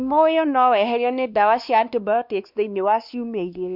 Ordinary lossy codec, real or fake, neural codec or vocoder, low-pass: none; fake; codec, 16 kHz, 2 kbps, X-Codec, WavLM features, trained on Multilingual LibriSpeech; 5.4 kHz